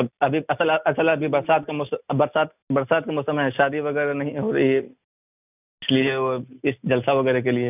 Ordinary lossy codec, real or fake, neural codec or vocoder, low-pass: none; real; none; 3.6 kHz